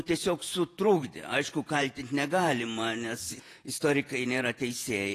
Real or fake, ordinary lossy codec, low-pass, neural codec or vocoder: fake; AAC, 48 kbps; 14.4 kHz; vocoder, 48 kHz, 128 mel bands, Vocos